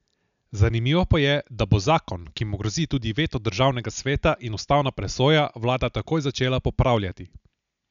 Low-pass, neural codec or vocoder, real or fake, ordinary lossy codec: 7.2 kHz; none; real; none